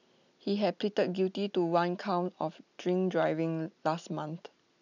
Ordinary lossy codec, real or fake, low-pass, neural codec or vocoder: none; real; 7.2 kHz; none